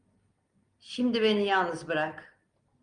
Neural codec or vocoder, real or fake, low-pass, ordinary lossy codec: none; real; 9.9 kHz; Opus, 32 kbps